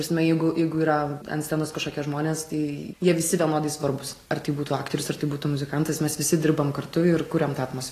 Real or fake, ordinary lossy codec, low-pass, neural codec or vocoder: real; AAC, 48 kbps; 14.4 kHz; none